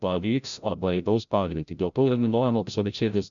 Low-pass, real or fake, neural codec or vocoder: 7.2 kHz; fake; codec, 16 kHz, 0.5 kbps, FreqCodec, larger model